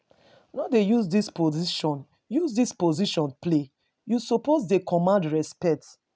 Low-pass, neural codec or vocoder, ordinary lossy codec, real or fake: none; none; none; real